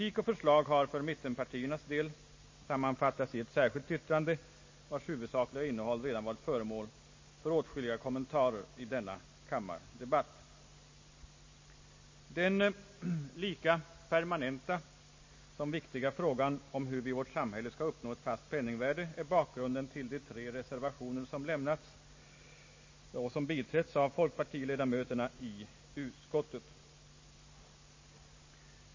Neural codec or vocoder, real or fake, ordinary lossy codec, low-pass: none; real; MP3, 32 kbps; 7.2 kHz